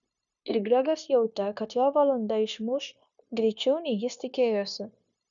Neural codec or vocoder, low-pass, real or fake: codec, 16 kHz, 0.9 kbps, LongCat-Audio-Codec; 7.2 kHz; fake